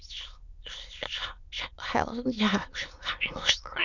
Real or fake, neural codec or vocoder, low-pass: fake; autoencoder, 22.05 kHz, a latent of 192 numbers a frame, VITS, trained on many speakers; 7.2 kHz